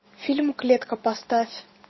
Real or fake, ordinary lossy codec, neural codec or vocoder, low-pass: real; MP3, 24 kbps; none; 7.2 kHz